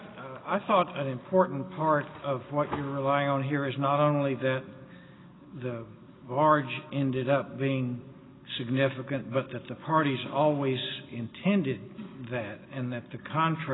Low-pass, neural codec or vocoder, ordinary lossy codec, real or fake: 7.2 kHz; none; AAC, 16 kbps; real